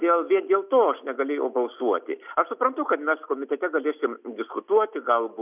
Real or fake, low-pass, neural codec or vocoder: real; 3.6 kHz; none